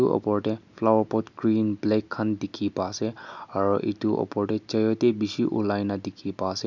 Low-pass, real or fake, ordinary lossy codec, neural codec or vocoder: 7.2 kHz; real; none; none